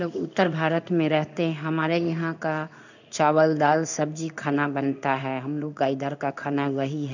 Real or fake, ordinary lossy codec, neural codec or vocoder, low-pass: fake; none; codec, 16 kHz in and 24 kHz out, 1 kbps, XY-Tokenizer; 7.2 kHz